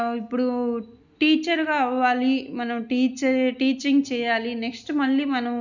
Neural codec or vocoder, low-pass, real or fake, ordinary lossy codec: none; 7.2 kHz; real; none